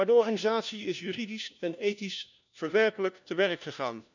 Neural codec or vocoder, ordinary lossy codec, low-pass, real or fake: codec, 16 kHz, 1 kbps, FunCodec, trained on LibriTTS, 50 frames a second; none; 7.2 kHz; fake